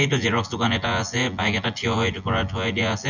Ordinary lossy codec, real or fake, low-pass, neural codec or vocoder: none; fake; 7.2 kHz; vocoder, 24 kHz, 100 mel bands, Vocos